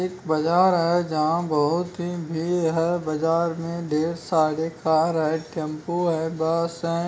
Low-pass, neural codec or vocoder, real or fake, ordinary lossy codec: none; none; real; none